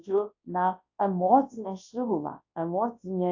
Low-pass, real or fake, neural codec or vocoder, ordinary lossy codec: 7.2 kHz; fake; codec, 24 kHz, 0.9 kbps, WavTokenizer, large speech release; AAC, 48 kbps